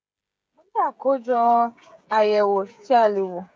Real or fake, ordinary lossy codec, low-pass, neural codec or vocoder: fake; none; none; codec, 16 kHz, 16 kbps, FreqCodec, smaller model